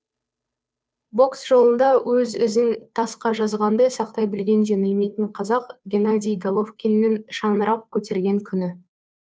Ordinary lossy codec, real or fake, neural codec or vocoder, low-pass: none; fake; codec, 16 kHz, 2 kbps, FunCodec, trained on Chinese and English, 25 frames a second; none